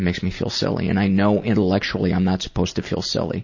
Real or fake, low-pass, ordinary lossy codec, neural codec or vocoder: real; 7.2 kHz; MP3, 32 kbps; none